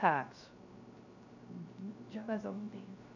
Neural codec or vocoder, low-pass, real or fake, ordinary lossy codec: codec, 16 kHz, 0.3 kbps, FocalCodec; 7.2 kHz; fake; none